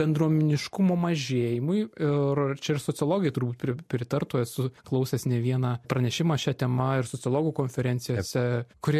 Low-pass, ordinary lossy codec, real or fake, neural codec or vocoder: 14.4 kHz; MP3, 64 kbps; real; none